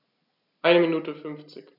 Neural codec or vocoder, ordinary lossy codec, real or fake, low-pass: none; none; real; 5.4 kHz